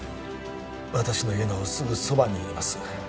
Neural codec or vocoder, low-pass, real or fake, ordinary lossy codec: none; none; real; none